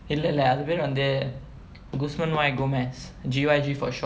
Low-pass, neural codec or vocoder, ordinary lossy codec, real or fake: none; none; none; real